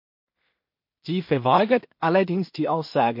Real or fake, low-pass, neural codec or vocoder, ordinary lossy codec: fake; 5.4 kHz; codec, 16 kHz in and 24 kHz out, 0.4 kbps, LongCat-Audio-Codec, two codebook decoder; MP3, 32 kbps